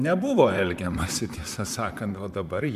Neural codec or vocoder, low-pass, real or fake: vocoder, 44.1 kHz, 128 mel bands, Pupu-Vocoder; 14.4 kHz; fake